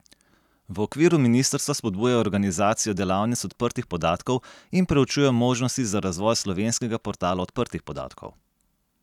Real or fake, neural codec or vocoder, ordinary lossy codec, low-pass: real; none; none; 19.8 kHz